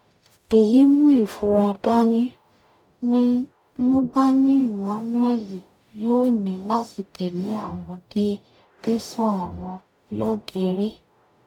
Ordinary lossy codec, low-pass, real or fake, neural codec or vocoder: none; 19.8 kHz; fake; codec, 44.1 kHz, 0.9 kbps, DAC